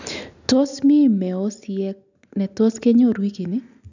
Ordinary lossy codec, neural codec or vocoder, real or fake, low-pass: none; none; real; 7.2 kHz